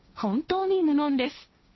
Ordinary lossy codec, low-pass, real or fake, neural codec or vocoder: MP3, 24 kbps; 7.2 kHz; fake; codec, 16 kHz, 1.1 kbps, Voila-Tokenizer